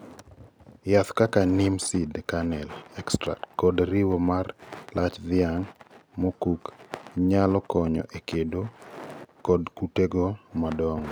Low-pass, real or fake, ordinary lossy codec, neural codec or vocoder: none; real; none; none